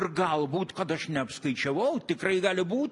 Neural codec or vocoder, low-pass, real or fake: none; 10.8 kHz; real